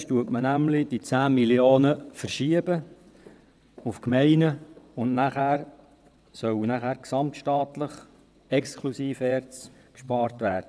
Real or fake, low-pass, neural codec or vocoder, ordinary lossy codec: fake; none; vocoder, 22.05 kHz, 80 mel bands, WaveNeXt; none